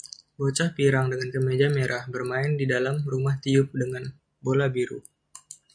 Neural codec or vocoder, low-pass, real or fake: none; 9.9 kHz; real